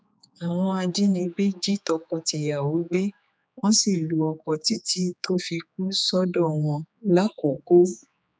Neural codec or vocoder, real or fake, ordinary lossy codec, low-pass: codec, 16 kHz, 4 kbps, X-Codec, HuBERT features, trained on general audio; fake; none; none